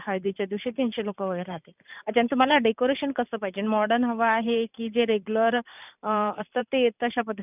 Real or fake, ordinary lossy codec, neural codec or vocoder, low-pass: fake; none; vocoder, 44.1 kHz, 128 mel bands every 512 samples, BigVGAN v2; 3.6 kHz